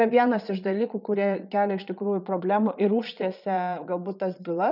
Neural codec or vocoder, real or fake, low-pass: vocoder, 22.05 kHz, 80 mel bands, Vocos; fake; 5.4 kHz